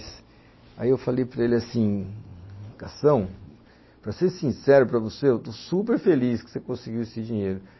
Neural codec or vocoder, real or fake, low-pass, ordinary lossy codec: none; real; 7.2 kHz; MP3, 24 kbps